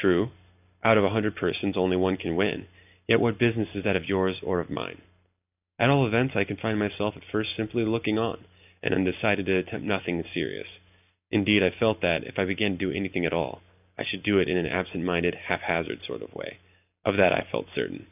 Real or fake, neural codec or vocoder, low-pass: real; none; 3.6 kHz